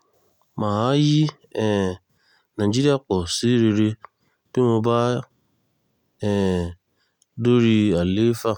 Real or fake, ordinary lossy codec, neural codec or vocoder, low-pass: real; none; none; 19.8 kHz